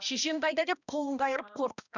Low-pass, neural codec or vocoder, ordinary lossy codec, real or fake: 7.2 kHz; codec, 16 kHz, 1 kbps, X-Codec, HuBERT features, trained on balanced general audio; none; fake